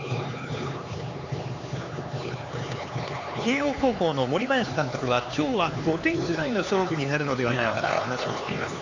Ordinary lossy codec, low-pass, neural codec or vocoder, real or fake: AAC, 48 kbps; 7.2 kHz; codec, 16 kHz, 4 kbps, X-Codec, HuBERT features, trained on LibriSpeech; fake